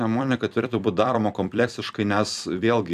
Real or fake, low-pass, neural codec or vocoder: fake; 14.4 kHz; vocoder, 44.1 kHz, 128 mel bands every 256 samples, BigVGAN v2